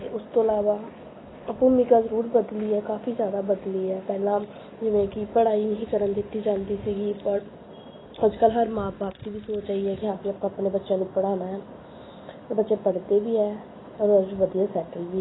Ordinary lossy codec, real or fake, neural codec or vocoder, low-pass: AAC, 16 kbps; real; none; 7.2 kHz